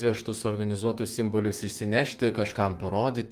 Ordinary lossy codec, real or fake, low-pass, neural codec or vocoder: Opus, 32 kbps; fake; 14.4 kHz; codec, 32 kHz, 1.9 kbps, SNAC